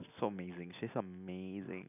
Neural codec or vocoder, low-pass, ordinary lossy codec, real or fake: none; 3.6 kHz; none; real